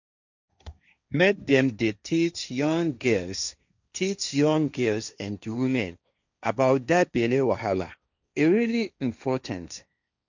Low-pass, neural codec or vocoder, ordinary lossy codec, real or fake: 7.2 kHz; codec, 16 kHz, 1.1 kbps, Voila-Tokenizer; none; fake